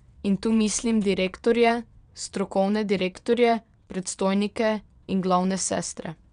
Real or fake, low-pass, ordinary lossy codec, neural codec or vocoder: fake; 9.9 kHz; none; vocoder, 22.05 kHz, 80 mel bands, WaveNeXt